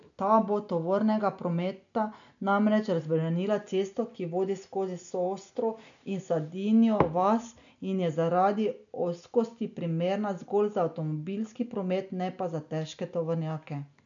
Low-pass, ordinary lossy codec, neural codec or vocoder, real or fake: 7.2 kHz; none; none; real